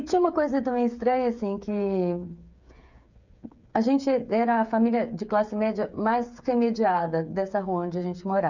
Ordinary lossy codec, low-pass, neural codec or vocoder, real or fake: none; 7.2 kHz; codec, 16 kHz, 8 kbps, FreqCodec, smaller model; fake